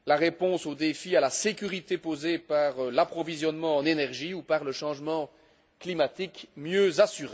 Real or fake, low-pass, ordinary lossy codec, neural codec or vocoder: real; none; none; none